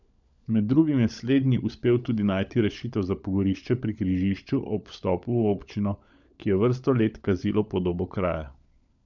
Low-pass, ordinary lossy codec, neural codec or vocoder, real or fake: 7.2 kHz; none; codec, 16 kHz, 16 kbps, FunCodec, trained on LibriTTS, 50 frames a second; fake